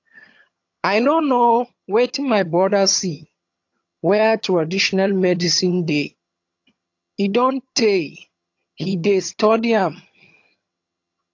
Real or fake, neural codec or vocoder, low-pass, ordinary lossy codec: fake; vocoder, 22.05 kHz, 80 mel bands, HiFi-GAN; 7.2 kHz; AAC, 48 kbps